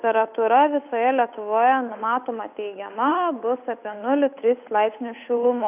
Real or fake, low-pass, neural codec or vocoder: fake; 3.6 kHz; codec, 16 kHz, 8 kbps, FunCodec, trained on Chinese and English, 25 frames a second